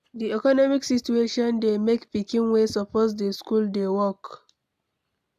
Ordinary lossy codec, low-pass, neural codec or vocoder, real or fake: AAC, 96 kbps; 14.4 kHz; none; real